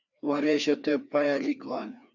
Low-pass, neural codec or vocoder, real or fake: 7.2 kHz; codec, 16 kHz, 2 kbps, FreqCodec, larger model; fake